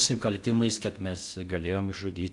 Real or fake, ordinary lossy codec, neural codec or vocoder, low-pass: fake; MP3, 96 kbps; codec, 16 kHz in and 24 kHz out, 0.6 kbps, FocalCodec, streaming, 4096 codes; 10.8 kHz